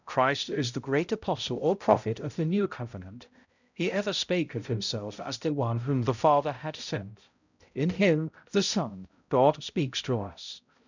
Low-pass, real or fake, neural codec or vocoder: 7.2 kHz; fake; codec, 16 kHz, 0.5 kbps, X-Codec, HuBERT features, trained on balanced general audio